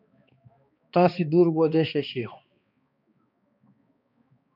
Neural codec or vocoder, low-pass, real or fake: codec, 16 kHz, 2 kbps, X-Codec, HuBERT features, trained on balanced general audio; 5.4 kHz; fake